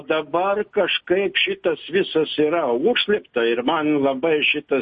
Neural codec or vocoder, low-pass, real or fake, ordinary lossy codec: none; 10.8 kHz; real; MP3, 32 kbps